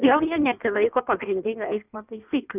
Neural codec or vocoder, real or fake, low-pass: codec, 16 kHz in and 24 kHz out, 1.1 kbps, FireRedTTS-2 codec; fake; 3.6 kHz